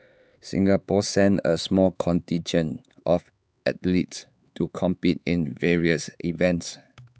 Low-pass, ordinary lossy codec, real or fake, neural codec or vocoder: none; none; fake; codec, 16 kHz, 4 kbps, X-Codec, HuBERT features, trained on LibriSpeech